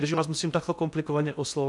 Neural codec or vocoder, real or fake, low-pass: codec, 16 kHz in and 24 kHz out, 0.6 kbps, FocalCodec, streaming, 2048 codes; fake; 10.8 kHz